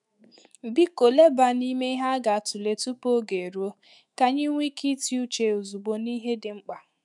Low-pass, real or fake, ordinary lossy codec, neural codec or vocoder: 10.8 kHz; fake; none; autoencoder, 48 kHz, 128 numbers a frame, DAC-VAE, trained on Japanese speech